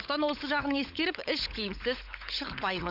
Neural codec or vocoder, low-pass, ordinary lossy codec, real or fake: codec, 16 kHz, 16 kbps, FunCodec, trained on LibriTTS, 50 frames a second; 5.4 kHz; none; fake